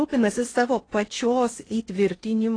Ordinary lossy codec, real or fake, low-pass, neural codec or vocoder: AAC, 32 kbps; fake; 9.9 kHz; codec, 16 kHz in and 24 kHz out, 0.6 kbps, FocalCodec, streaming, 4096 codes